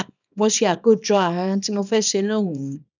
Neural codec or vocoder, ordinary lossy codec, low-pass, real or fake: codec, 24 kHz, 0.9 kbps, WavTokenizer, small release; none; 7.2 kHz; fake